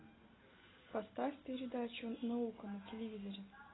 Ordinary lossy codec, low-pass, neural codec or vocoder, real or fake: AAC, 16 kbps; 7.2 kHz; none; real